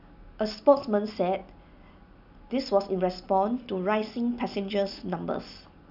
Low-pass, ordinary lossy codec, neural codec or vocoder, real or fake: 5.4 kHz; none; none; real